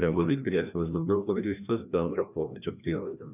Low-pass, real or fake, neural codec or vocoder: 3.6 kHz; fake; codec, 16 kHz, 1 kbps, FreqCodec, larger model